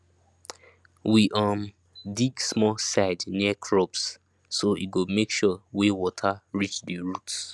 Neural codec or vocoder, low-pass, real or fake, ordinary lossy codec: none; none; real; none